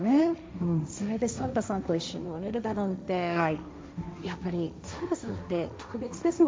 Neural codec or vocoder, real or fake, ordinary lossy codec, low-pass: codec, 16 kHz, 1.1 kbps, Voila-Tokenizer; fake; none; none